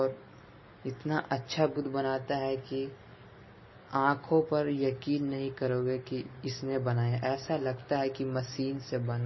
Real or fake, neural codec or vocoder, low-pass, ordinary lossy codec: real; none; 7.2 kHz; MP3, 24 kbps